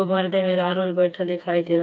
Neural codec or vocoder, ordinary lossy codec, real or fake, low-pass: codec, 16 kHz, 2 kbps, FreqCodec, smaller model; none; fake; none